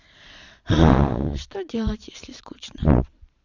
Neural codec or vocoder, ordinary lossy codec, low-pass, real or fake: vocoder, 22.05 kHz, 80 mel bands, Vocos; none; 7.2 kHz; fake